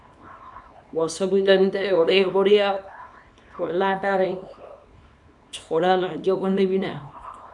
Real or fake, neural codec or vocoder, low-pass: fake; codec, 24 kHz, 0.9 kbps, WavTokenizer, small release; 10.8 kHz